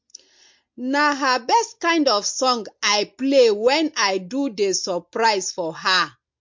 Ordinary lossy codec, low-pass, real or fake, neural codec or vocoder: MP3, 48 kbps; 7.2 kHz; real; none